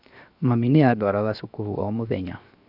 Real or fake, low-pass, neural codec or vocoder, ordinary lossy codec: fake; 5.4 kHz; codec, 16 kHz, 0.7 kbps, FocalCodec; none